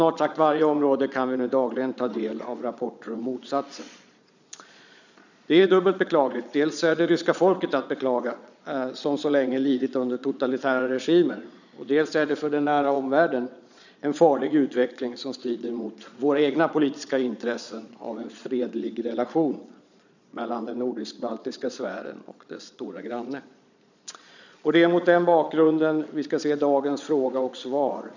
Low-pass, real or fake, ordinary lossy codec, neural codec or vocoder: 7.2 kHz; fake; none; vocoder, 22.05 kHz, 80 mel bands, Vocos